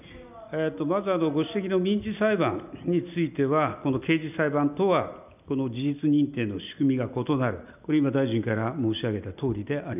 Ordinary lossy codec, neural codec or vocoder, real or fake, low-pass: none; none; real; 3.6 kHz